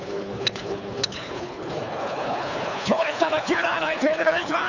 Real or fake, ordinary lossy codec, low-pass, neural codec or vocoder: fake; none; 7.2 kHz; codec, 24 kHz, 3 kbps, HILCodec